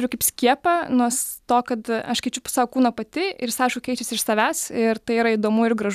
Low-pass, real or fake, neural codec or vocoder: 14.4 kHz; real; none